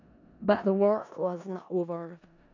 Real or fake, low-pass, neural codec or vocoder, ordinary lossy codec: fake; 7.2 kHz; codec, 16 kHz in and 24 kHz out, 0.4 kbps, LongCat-Audio-Codec, four codebook decoder; none